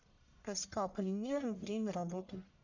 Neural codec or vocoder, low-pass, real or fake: codec, 44.1 kHz, 1.7 kbps, Pupu-Codec; 7.2 kHz; fake